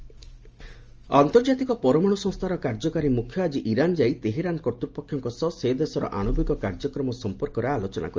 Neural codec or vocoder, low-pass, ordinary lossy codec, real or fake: none; 7.2 kHz; Opus, 24 kbps; real